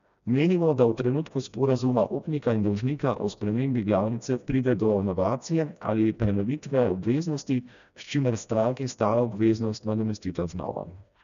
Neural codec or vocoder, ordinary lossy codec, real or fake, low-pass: codec, 16 kHz, 1 kbps, FreqCodec, smaller model; none; fake; 7.2 kHz